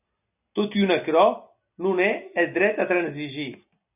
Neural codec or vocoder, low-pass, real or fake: none; 3.6 kHz; real